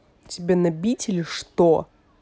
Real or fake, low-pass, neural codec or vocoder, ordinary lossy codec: real; none; none; none